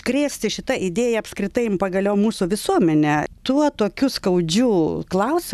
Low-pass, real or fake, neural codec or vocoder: 14.4 kHz; real; none